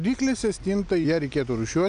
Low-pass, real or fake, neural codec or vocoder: 9.9 kHz; real; none